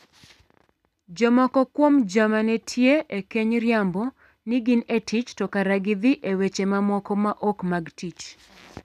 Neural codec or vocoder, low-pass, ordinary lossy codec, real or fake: none; 14.4 kHz; none; real